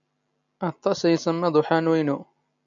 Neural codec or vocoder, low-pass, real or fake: none; 7.2 kHz; real